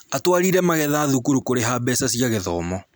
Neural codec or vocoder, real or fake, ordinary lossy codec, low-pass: none; real; none; none